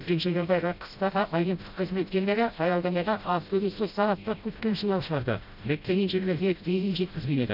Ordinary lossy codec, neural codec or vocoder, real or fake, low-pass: none; codec, 16 kHz, 0.5 kbps, FreqCodec, smaller model; fake; 5.4 kHz